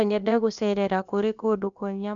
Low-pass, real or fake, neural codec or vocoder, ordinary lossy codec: 7.2 kHz; fake; codec, 16 kHz, about 1 kbps, DyCAST, with the encoder's durations; none